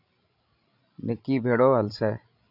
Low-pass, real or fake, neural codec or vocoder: 5.4 kHz; fake; codec, 16 kHz, 16 kbps, FreqCodec, larger model